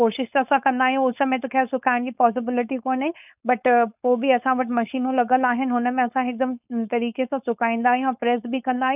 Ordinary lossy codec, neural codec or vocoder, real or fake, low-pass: none; codec, 16 kHz, 4.8 kbps, FACodec; fake; 3.6 kHz